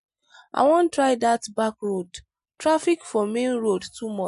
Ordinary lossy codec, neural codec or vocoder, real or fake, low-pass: MP3, 48 kbps; none; real; 10.8 kHz